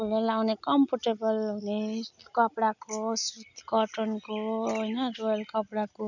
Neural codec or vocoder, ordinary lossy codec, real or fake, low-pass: none; none; real; 7.2 kHz